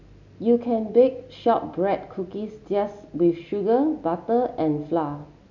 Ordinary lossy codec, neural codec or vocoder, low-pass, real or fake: none; none; 7.2 kHz; real